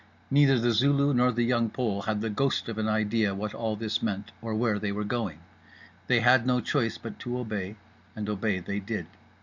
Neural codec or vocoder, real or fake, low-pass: none; real; 7.2 kHz